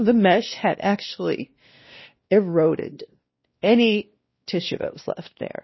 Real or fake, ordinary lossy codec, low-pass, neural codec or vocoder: fake; MP3, 24 kbps; 7.2 kHz; codec, 16 kHz, 1 kbps, X-Codec, WavLM features, trained on Multilingual LibriSpeech